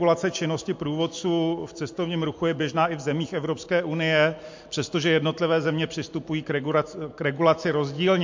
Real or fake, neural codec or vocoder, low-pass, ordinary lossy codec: real; none; 7.2 kHz; MP3, 48 kbps